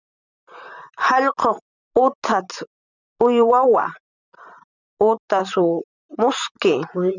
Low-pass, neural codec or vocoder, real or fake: 7.2 kHz; vocoder, 44.1 kHz, 128 mel bands, Pupu-Vocoder; fake